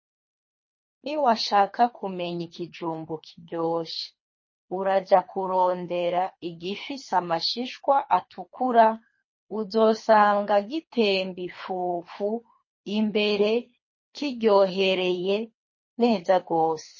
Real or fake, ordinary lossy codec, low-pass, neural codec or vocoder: fake; MP3, 32 kbps; 7.2 kHz; codec, 24 kHz, 3 kbps, HILCodec